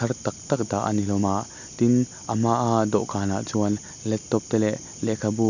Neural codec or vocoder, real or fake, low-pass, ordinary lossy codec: none; real; 7.2 kHz; none